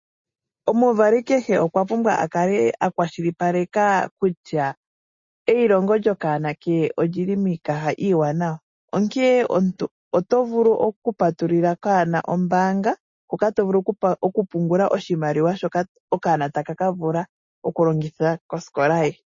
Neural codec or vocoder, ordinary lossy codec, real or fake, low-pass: none; MP3, 32 kbps; real; 7.2 kHz